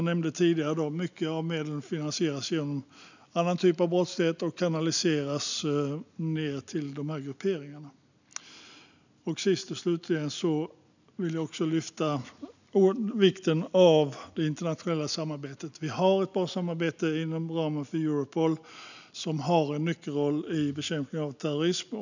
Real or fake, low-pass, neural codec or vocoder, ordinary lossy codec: real; 7.2 kHz; none; none